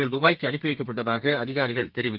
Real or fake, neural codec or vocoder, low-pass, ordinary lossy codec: fake; codec, 32 kHz, 1.9 kbps, SNAC; 5.4 kHz; Opus, 32 kbps